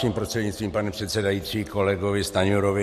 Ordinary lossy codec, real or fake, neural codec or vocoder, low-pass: MP3, 64 kbps; real; none; 14.4 kHz